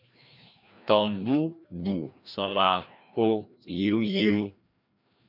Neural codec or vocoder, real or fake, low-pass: codec, 16 kHz, 1 kbps, FreqCodec, larger model; fake; 5.4 kHz